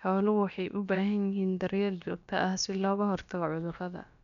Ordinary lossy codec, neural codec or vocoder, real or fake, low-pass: none; codec, 16 kHz, about 1 kbps, DyCAST, with the encoder's durations; fake; 7.2 kHz